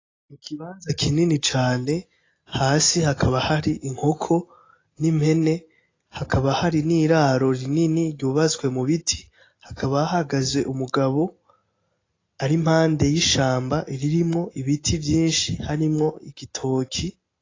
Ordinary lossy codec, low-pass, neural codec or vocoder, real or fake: AAC, 32 kbps; 7.2 kHz; none; real